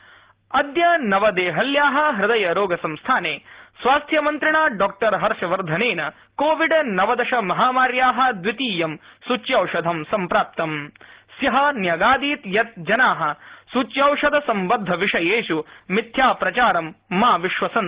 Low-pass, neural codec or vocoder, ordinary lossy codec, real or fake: 3.6 kHz; none; Opus, 16 kbps; real